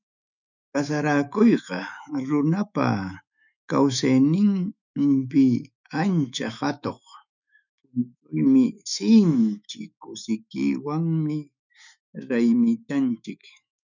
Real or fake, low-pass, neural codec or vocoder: fake; 7.2 kHz; autoencoder, 48 kHz, 128 numbers a frame, DAC-VAE, trained on Japanese speech